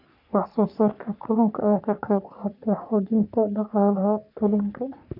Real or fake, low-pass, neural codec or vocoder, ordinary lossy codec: fake; 5.4 kHz; codec, 44.1 kHz, 3.4 kbps, Pupu-Codec; none